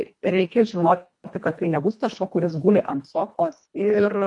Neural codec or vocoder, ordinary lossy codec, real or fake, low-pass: codec, 24 kHz, 1.5 kbps, HILCodec; MP3, 64 kbps; fake; 10.8 kHz